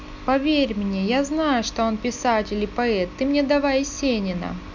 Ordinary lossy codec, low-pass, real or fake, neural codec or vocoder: none; 7.2 kHz; real; none